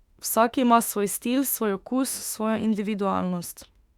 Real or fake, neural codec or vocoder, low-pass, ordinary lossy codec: fake; autoencoder, 48 kHz, 32 numbers a frame, DAC-VAE, trained on Japanese speech; 19.8 kHz; Opus, 64 kbps